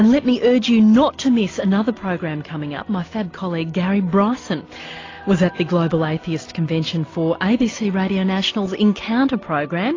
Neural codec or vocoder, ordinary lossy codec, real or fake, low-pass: none; AAC, 32 kbps; real; 7.2 kHz